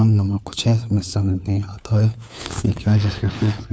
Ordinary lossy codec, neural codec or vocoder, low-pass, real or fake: none; codec, 16 kHz, 4 kbps, FunCodec, trained on LibriTTS, 50 frames a second; none; fake